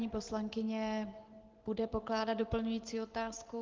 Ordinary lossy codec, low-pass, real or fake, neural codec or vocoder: Opus, 16 kbps; 7.2 kHz; real; none